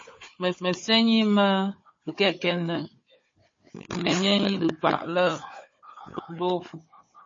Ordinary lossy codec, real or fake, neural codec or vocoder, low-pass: MP3, 32 kbps; fake; codec, 16 kHz, 4 kbps, FunCodec, trained on Chinese and English, 50 frames a second; 7.2 kHz